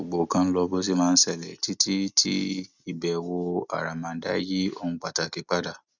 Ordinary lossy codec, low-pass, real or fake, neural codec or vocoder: none; 7.2 kHz; real; none